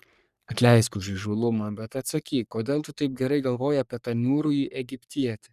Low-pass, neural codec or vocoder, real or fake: 14.4 kHz; codec, 44.1 kHz, 3.4 kbps, Pupu-Codec; fake